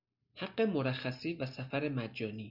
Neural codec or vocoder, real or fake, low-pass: none; real; 5.4 kHz